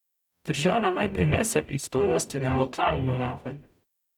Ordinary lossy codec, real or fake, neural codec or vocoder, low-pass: none; fake; codec, 44.1 kHz, 0.9 kbps, DAC; 19.8 kHz